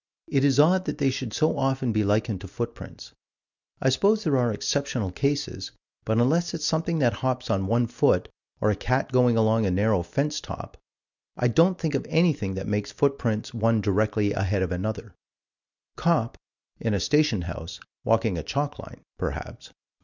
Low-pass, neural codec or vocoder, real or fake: 7.2 kHz; none; real